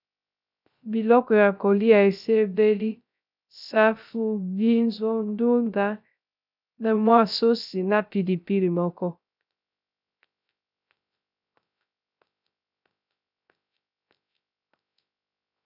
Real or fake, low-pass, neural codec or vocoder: fake; 5.4 kHz; codec, 16 kHz, 0.3 kbps, FocalCodec